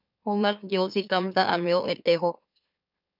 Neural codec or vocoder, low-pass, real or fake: autoencoder, 44.1 kHz, a latent of 192 numbers a frame, MeloTTS; 5.4 kHz; fake